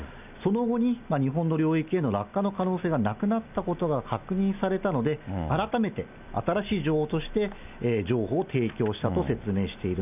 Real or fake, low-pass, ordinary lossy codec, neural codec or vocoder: real; 3.6 kHz; none; none